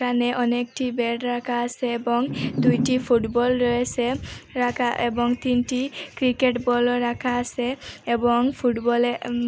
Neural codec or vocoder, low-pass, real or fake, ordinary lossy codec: none; none; real; none